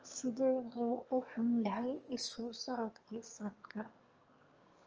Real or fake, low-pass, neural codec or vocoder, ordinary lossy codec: fake; 7.2 kHz; autoencoder, 22.05 kHz, a latent of 192 numbers a frame, VITS, trained on one speaker; Opus, 24 kbps